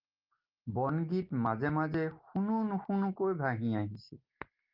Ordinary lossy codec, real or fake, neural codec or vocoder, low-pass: Opus, 32 kbps; real; none; 5.4 kHz